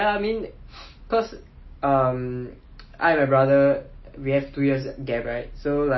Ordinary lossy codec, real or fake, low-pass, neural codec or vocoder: MP3, 24 kbps; real; 7.2 kHz; none